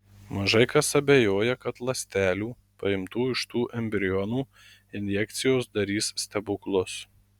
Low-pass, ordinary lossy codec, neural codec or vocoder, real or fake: 19.8 kHz; Opus, 64 kbps; none; real